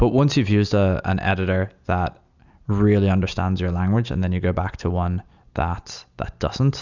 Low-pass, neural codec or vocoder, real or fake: 7.2 kHz; none; real